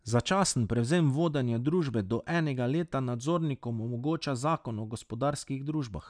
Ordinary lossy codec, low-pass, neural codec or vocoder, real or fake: none; 9.9 kHz; none; real